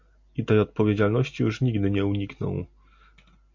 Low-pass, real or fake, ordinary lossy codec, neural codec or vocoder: 7.2 kHz; real; MP3, 48 kbps; none